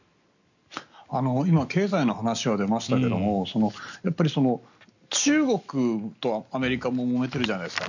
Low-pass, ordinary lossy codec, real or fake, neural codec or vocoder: 7.2 kHz; none; real; none